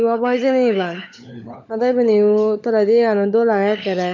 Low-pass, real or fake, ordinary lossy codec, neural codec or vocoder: 7.2 kHz; fake; none; codec, 16 kHz, 16 kbps, FunCodec, trained on LibriTTS, 50 frames a second